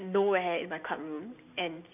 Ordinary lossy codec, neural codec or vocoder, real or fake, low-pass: none; none; real; 3.6 kHz